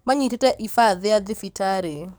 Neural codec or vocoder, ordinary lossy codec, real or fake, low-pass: codec, 44.1 kHz, 7.8 kbps, DAC; none; fake; none